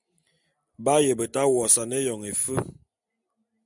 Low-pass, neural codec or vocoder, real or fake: 10.8 kHz; none; real